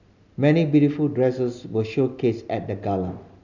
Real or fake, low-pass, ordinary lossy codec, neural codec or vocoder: real; 7.2 kHz; none; none